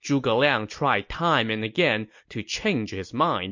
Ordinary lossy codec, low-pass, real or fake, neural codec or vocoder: MP3, 48 kbps; 7.2 kHz; real; none